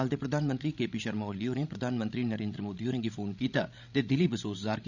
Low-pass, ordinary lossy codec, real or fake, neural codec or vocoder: 7.2 kHz; none; fake; codec, 16 kHz, 16 kbps, FreqCodec, larger model